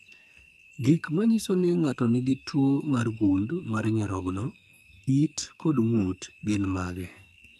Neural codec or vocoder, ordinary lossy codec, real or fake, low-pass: codec, 44.1 kHz, 2.6 kbps, SNAC; none; fake; 14.4 kHz